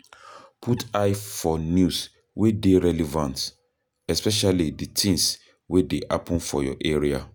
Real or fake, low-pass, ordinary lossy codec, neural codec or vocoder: real; none; none; none